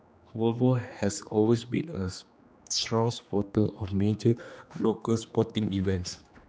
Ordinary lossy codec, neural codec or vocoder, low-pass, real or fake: none; codec, 16 kHz, 2 kbps, X-Codec, HuBERT features, trained on general audio; none; fake